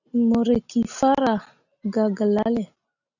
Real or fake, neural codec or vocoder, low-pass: real; none; 7.2 kHz